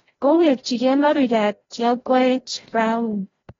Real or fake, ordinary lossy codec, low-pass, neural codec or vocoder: fake; AAC, 24 kbps; 7.2 kHz; codec, 16 kHz, 0.5 kbps, FreqCodec, larger model